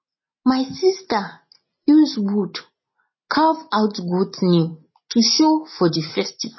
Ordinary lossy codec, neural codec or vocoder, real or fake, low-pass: MP3, 24 kbps; none; real; 7.2 kHz